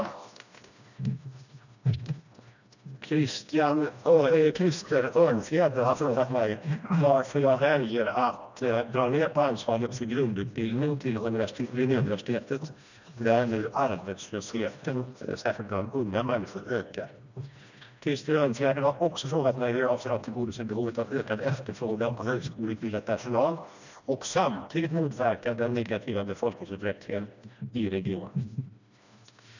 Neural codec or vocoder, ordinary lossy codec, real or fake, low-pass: codec, 16 kHz, 1 kbps, FreqCodec, smaller model; none; fake; 7.2 kHz